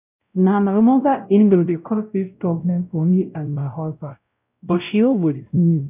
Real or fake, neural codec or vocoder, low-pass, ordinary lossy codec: fake; codec, 16 kHz, 0.5 kbps, X-Codec, WavLM features, trained on Multilingual LibriSpeech; 3.6 kHz; none